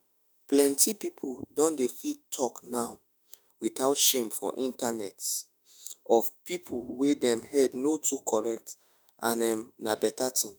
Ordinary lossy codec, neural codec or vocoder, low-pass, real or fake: none; autoencoder, 48 kHz, 32 numbers a frame, DAC-VAE, trained on Japanese speech; none; fake